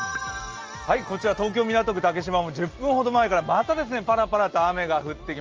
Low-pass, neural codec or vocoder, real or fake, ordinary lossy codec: 7.2 kHz; none; real; Opus, 24 kbps